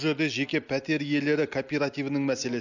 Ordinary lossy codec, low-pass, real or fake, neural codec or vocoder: none; 7.2 kHz; real; none